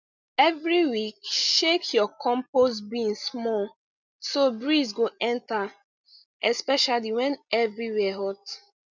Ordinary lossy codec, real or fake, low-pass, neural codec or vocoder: none; real; 7.2 kHz; none